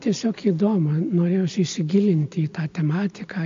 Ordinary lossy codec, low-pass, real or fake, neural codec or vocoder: AAC, 48 kbps; 7.2 kHz; real; none